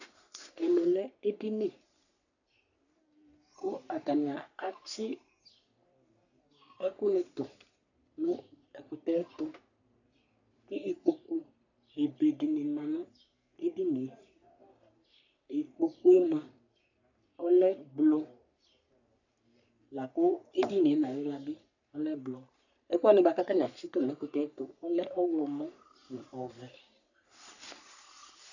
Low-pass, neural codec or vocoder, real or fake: 7.2 kHz; codec, 44.1 kHz, 3.4 kbps, Pupu-Codec; fake